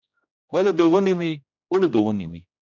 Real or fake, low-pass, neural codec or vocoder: fake; 7.2 kHz; codec, 16 kHz, 1 kbps, X-Codec, HuBERT features, trained on general audio